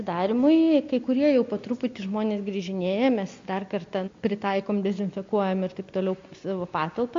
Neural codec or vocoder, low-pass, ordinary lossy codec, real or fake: none; 7.2 kHz; MP3, 48 kbps; real